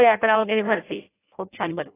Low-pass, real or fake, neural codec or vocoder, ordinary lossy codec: 3.6 kHz; fake; codec, 16 kHz in and 24 kHz out, 0.6 kbps, FireRedTTS-2 codec; AAC, 16 kbps